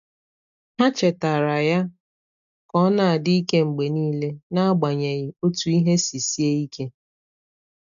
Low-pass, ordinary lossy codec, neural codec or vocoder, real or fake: 7.2 kHz; none; none; real